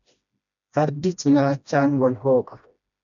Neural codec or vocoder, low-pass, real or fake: codec, 16 kHz, 1 kbps, FreqCodec, smaller model; 7.2 kHz; fake